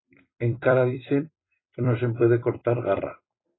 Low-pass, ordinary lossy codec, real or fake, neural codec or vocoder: 7.2 kHz; AAC, 16 kbps; fake; vocoder, 44.1 kHz, 128 mel bands every 256 samples, BigVGAN v2